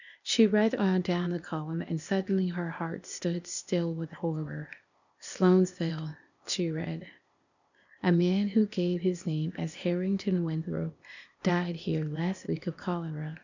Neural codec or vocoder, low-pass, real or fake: codec, 16 kHz, 0.8 kbps, ZipCodec; 7.2 kHz; fake